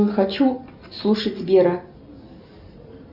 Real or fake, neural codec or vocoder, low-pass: real; none; 5.4 kHz